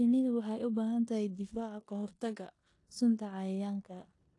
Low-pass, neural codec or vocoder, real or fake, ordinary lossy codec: 10.8 kHz; codec, 16 kHz in and 24 kHz out, 0.9 kbps, LongCat-Audio-Codec, four codebook decoder; fake; AAC, 48 kbps